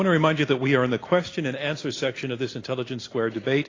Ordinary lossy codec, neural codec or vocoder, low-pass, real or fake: AAC, 32 kbps; none; 7.2 kHz; real